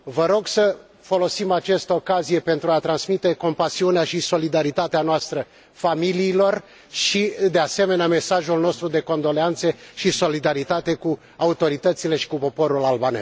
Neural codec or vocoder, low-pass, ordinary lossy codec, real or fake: none; none; none; real